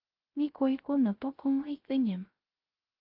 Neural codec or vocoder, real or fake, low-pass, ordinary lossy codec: codec, 16 kHz, 0.3 kbps, FocalCodec; fake; 5.4 kHz; Opus, 32 kbps